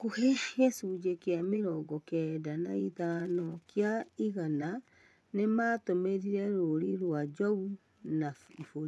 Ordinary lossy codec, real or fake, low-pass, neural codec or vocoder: none; fake; none; vocoder, 24 kHz, 100 mel bands, Vocos